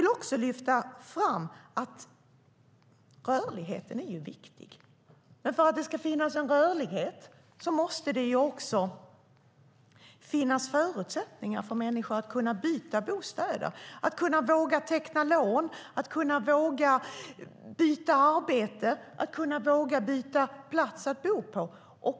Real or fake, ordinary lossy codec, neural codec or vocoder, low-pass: real; none; none; none